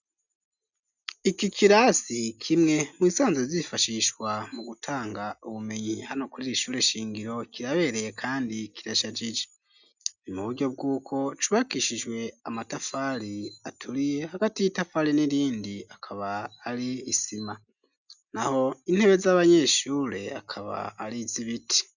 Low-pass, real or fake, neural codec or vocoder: 7.2 kHz; real; none